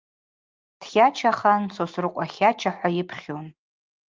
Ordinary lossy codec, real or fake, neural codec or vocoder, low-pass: Opus, 32 kbps; real; none; 7.2 kHz